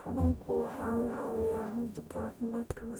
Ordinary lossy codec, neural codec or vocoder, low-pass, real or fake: none; codec, 44.1 kHz, 0.9 kbps, DAC; none; fake